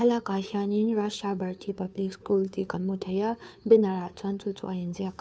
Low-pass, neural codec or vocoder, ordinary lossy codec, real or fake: none; codec, 16 kHz, 2 kbps, FunCodec, trained on Chinese and English, 25 frames a second; none; fake